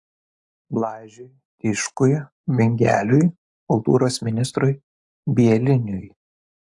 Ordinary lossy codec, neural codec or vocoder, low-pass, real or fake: AAC, 64 kbps; none; 10.8 kHz; real